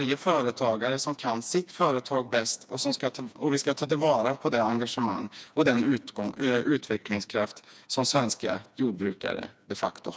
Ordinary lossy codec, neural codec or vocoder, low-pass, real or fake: none; codec, 16 kHz, 2 kbps, FreqCodec, smaller model; none; fake